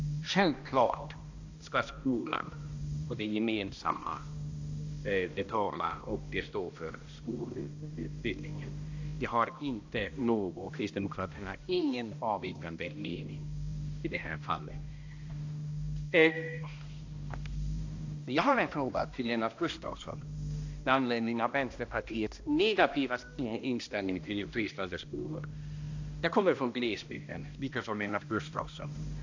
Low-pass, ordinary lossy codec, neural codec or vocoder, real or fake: 7.2 kHz; AAC, 48 kbps; codec, 16 kHz, 1 kbps, X-Codec, HuBERT features, trained on balanced general audio; fake